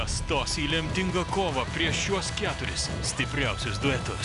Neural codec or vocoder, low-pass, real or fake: none; 10.8 kHz; real